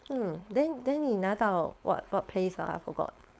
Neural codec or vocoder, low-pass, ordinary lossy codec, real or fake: codec, 16 kHz, 4.8 kbps, FACodec; none; none; fake